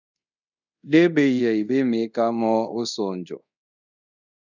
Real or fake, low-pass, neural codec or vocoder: fake; 7.2 kHz; codec, 24 kHz, 0.5 kbps, DualCodec